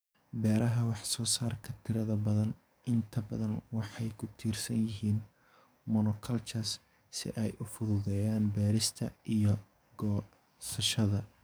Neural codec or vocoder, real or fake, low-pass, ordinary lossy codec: codec, 44.1 kHz, 7.8 kbps, DAC; fake; none; none